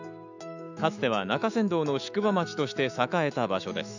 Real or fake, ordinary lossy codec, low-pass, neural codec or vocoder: fake; none; 7.2 kHz; autoencoder, 48 kHz, 128 numbers a frame, DAC-VAE, trained on Japanese speech